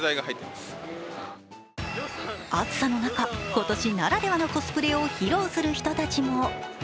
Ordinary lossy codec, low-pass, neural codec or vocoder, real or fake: none; none; none; real